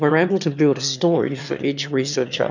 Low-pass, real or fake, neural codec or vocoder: 7.2 kHz; fake; autoencoder, 22.05 kHz, a latent of 192 numbers a frame, VITS, trained on one speaker